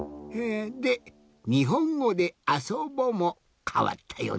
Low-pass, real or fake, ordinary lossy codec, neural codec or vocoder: none; real; none; none